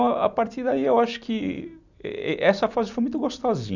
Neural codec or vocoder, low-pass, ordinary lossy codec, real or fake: none; 7.2 kHz; none; real